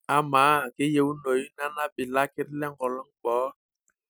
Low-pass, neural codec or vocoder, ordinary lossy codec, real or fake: none; none; none; real